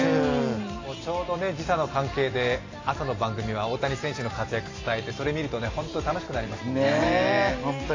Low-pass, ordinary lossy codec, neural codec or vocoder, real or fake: 7.2 kHz; AAC, 32 kbps; none; real